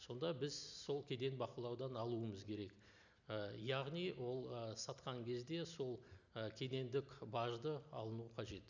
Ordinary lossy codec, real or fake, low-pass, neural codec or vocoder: none; real; 7.2 kHz; none